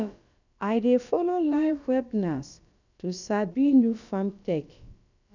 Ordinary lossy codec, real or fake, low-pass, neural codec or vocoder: none; fake; 7.2 kHz; codec, 16 kHz, about 1 kbps, DyCAST, with the encoder's durations